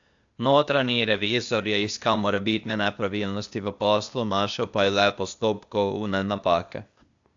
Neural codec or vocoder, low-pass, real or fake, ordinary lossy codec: codec, 16 kHz, 0.8 kbps, ZipCodec; 7.2 kHz; fake; AAC, 64 kbps